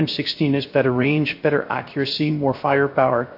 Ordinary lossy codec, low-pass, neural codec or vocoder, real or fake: MP3, 32 kbps; 5.4 kHz; codec, 16 kHz, 0.3 kbps, FocalCodec; fake